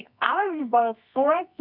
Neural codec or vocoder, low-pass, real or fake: codec, 24 kHz, 0.9 kbps, WavTokenizer, medium music audio release; 5.4 kHz; fake